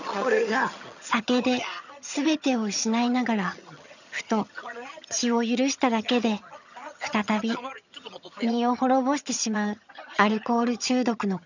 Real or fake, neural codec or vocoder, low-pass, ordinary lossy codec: fake; vocoder, 22.05 kHz, 80 mel bands, HiFi-GAN; 7.2 kHz; none